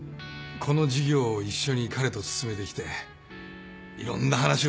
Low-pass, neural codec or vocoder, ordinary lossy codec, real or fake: none; none; none; real